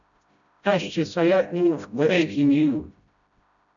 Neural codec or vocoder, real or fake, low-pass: codec, 16 kHz, 0.5 kbps, FreqCodec, smaller model; fake; 7.2 kHz